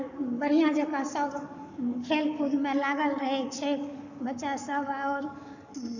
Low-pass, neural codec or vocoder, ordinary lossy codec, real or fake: 7.2 kHz; codec, 44.1 kHz, 7.8 kbps, Pupu-Codec; none; fake